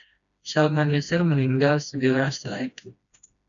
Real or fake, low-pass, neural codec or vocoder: fake; 7.2 kHz; codec, 16 kHz, 1 kbps, FreqCodec, smaller model